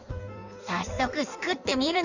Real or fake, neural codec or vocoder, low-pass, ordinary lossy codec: fake; codec, 16 kHz in and 24 kHz out, 1.1 kbps, FireRedTTS-2 codec; 7.2 kHz; none